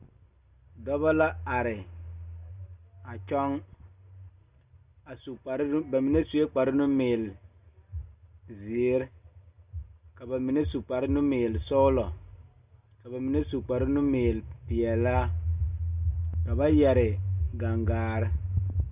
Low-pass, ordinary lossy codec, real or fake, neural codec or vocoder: 3.6 kHz; Opus, 64 kbps; real; none